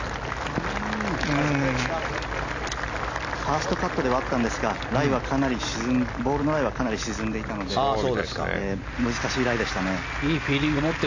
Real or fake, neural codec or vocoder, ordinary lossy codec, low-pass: real; none; AAC, 48 kbps; 7.2 kHz